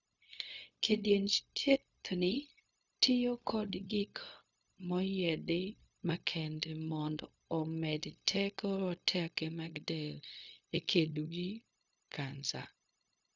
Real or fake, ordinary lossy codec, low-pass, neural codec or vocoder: fake; none; 7.2 kHz; codec, 16 kHz, 0.4 kbps, LongCat-Audio-Codec